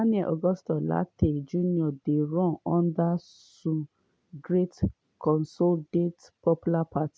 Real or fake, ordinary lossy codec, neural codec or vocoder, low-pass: real; none; none; none